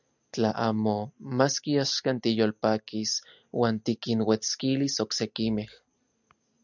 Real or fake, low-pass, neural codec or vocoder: real; 7.2 kHz; none